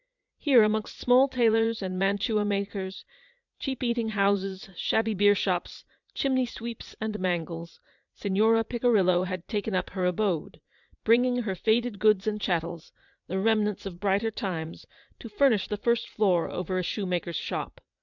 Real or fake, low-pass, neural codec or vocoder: fake; 7.2 kHz; vocoder, 44.1 kHz, 80 mel bands, Vocos